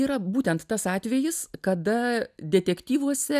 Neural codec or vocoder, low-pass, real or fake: none; 14.4 kHz; real